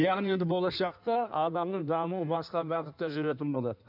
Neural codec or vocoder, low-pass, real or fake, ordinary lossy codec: codec, 16 kHz in and 24 kHz out, 1.1 kbps, FireRedTTS-2 codec; 5.4 kHz; fake; none